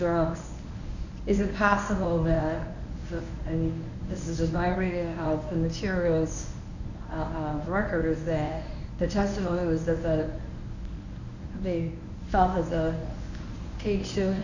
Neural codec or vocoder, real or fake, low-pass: codec, 24 kHz, 0.9 kbps, WavTokenizer, medium speech release version 1; fake; 7.2 kHz